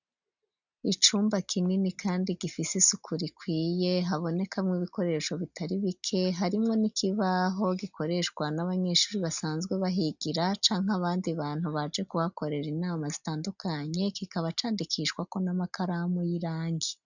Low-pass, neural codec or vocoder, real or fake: 7.2 kHz; none; real